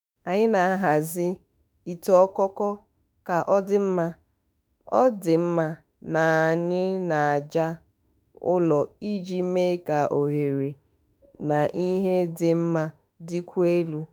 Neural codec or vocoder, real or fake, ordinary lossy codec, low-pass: autoencoder, 48 kHz, 32 numbers a frame, DAC-VAE, trained on Japanese speech; fake; none; none